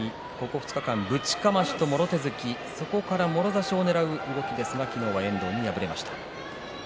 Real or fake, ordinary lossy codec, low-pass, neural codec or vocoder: real; none; none; none